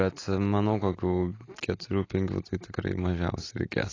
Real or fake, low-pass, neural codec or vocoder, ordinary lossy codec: real; 7.2 kHz; none; AAC, 32 kbps